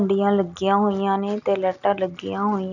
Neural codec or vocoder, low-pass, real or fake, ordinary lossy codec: none; 7.2 kHz; real; none